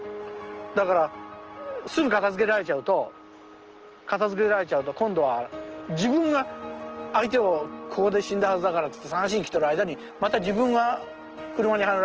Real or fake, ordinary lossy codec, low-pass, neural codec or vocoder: real; Opus, 16 kbps; 7.2 kHz; none